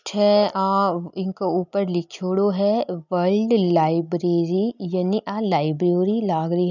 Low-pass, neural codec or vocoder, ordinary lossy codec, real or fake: 7.2 kHz; none; none; real